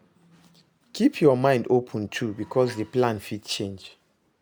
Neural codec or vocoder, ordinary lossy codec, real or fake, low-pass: none; none; real; none